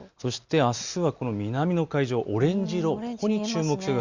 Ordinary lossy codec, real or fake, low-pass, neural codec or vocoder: Opus, 64 kbps; real; 7.2 kHz; none